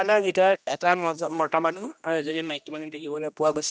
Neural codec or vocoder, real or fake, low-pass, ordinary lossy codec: codec, 16 kHz, 1 kbps, X-Codec, HuBERT features, trained on general audio; fake; none; none